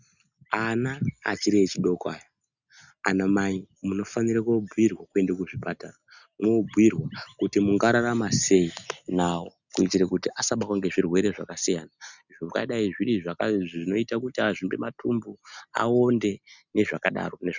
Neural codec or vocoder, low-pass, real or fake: none; 7.2 kHz; real